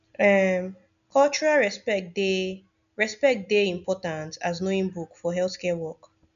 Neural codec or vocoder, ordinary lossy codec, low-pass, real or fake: none; none; 7.2 kHz; real